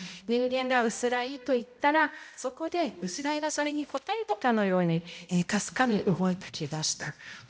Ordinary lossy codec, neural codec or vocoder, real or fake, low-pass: none; codec, 16 kHz, 0.5 kbps, X-Codec, HuBERT features, trained on balanced general audio; fake; none